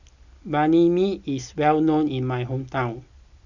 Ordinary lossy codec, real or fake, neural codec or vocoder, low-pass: none; real; none; 7.2 kHz